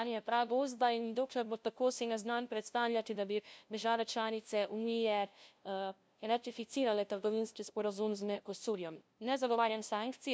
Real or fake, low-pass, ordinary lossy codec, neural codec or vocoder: fake; none; none; codec, 16 kHz, 0.5 kbps, FunCodec, trained on LibriTTS, 25 frames a second